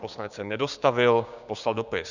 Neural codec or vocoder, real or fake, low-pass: codec, 16 kHz, 6 kbps, DAC; fake; 7.2 kHz